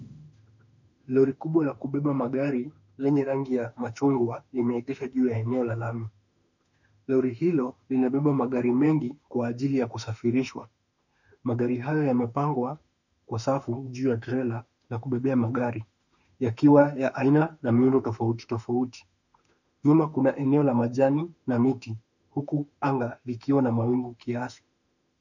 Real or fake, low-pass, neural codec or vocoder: fake; 7.2 kHz; autoencoder, 48 kHz, 32 numbers a frame, DAC-VAE, trained on Japanese speech